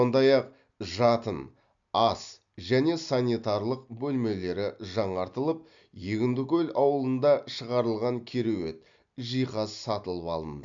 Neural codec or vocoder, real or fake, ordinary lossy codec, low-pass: none; real; MP3, 64 kbps; 7.2 kHz